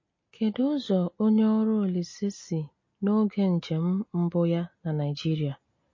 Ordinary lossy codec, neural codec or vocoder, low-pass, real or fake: MP3, 32 kbps; none; 7.2 kHz; real